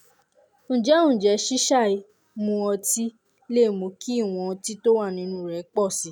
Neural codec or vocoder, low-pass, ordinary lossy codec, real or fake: none; none; none; real